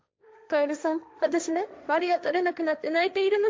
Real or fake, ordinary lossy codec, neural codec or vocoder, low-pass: fake; none; codec, 16 kHz, 1.1 kbps, Voila-Tokenizer; none